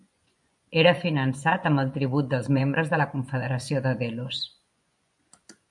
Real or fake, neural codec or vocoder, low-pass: fake; vocoder, 24 kHz, 100 mel bands, Vocos; 10.8 kHz